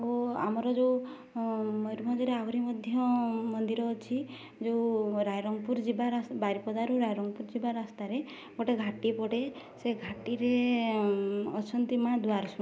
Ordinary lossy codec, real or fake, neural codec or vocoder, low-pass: none; real; none; none